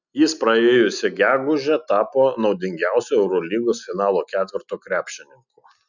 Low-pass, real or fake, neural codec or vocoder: 7.2 kHz; real; none